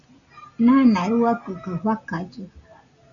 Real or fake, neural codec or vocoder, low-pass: real; none; 7.2 kHz